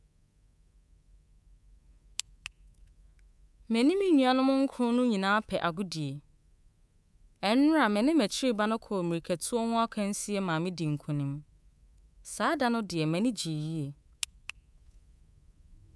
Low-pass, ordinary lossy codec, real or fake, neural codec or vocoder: none; none; fake; codec, 24 kHz, 3.1 kbps, DualCodec